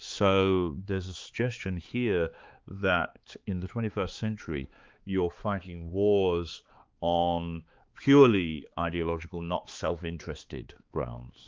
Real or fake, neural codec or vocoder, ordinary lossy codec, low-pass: fake; codec, 16 kHz, 4 kbps, X-Codec, HuBERT features, trained on balanced general audio; Opus, 32 kbps; 7.2 kHz